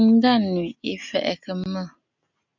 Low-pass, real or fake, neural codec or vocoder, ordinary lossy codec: 7.2 kHz; real; none; MP3, 64 kbps